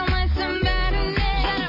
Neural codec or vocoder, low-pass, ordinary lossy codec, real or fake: none; 5.4 kHz; MP3, 24 kbps; real